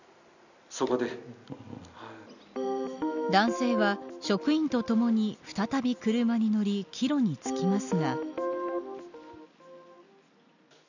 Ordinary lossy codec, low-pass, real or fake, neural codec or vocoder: none; 7.2 kHz; real; none